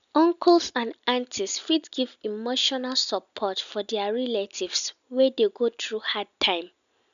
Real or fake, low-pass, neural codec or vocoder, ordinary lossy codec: real; 7.2 kHz; none; none